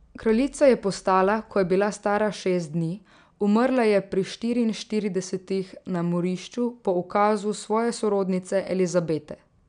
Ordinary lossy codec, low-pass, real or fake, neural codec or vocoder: none; 9.9 kHz; real; none